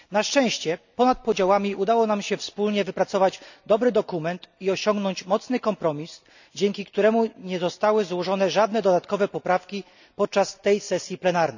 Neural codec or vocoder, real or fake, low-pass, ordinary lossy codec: none; real; 7.2 kHz; none